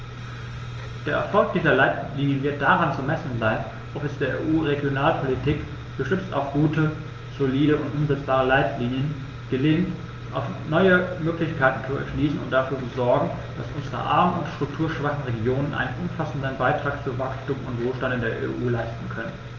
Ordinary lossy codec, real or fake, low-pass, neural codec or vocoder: Opus, 24 kbps; real; 7.2 kHz; none